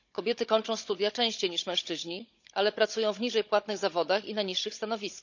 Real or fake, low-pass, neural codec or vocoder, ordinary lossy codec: fake; 7.2 kHz; codec, 16 kHz, 16 kbps, FunCodec, trained on Chinese and English, 50 frames a second; none